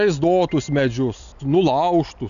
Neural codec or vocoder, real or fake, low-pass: none; real; 7.2 kHz